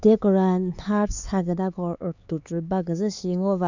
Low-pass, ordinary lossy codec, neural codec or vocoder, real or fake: 7.2 kHz; none; codec, 16 kHz, 4 kbps, X-Codec, HuBERT features, trained on LibriSpeech; fake